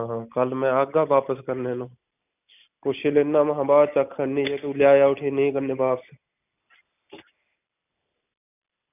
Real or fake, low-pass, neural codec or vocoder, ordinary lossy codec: real; 3.6 kHz; none; none